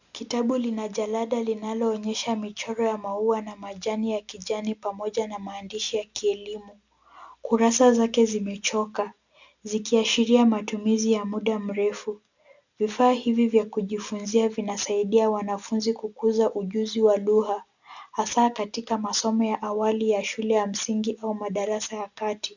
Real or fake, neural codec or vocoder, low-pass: real; none; 7.2 kHz